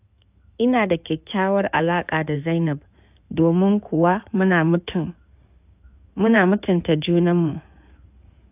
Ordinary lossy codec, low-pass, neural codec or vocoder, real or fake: AAC, 32 kbps; 3.6 kHz; codec, 16 kHz in and 24 kHz out, 2.2 kbps, FireRedTTS-2 codec; fake